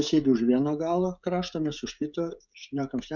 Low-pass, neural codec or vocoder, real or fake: 7.2 kHz; codec, 16 kHz, 16 kbps, FreqCodec, smaller model; fake